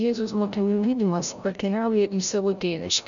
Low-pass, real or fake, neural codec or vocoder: 7.2 kHz; fake; codec, 16 kHz, 0.5 kbps, FreqCodec, larger model